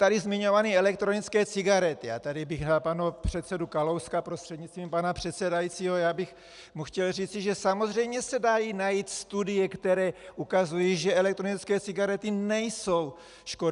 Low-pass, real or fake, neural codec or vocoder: 10.8 kHz; real; none